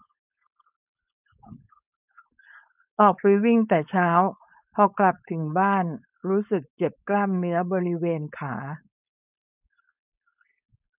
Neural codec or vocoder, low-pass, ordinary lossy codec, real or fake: codec, 16 kHz, 4.8 kbps, FACodec; 3.6 kHz; none; fake